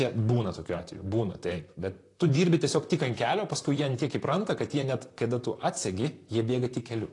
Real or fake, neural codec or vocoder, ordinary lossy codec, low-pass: fake; vocoder, 44.1 kHz, 128 mel bands, Pupu-Vocoder; AAC, 48 kbps; 10.8 kHz